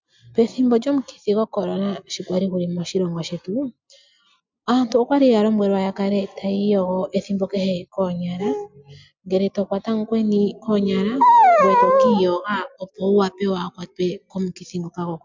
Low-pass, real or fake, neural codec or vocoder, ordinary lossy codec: 7.2 kHz; real; none; MP3, 64 kbps